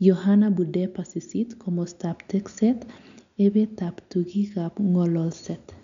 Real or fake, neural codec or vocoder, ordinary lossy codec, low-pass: real; none; none; 7.2 kHz